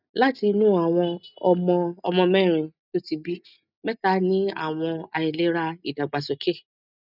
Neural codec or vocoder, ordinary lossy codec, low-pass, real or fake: none; none; 5.4 kHz; real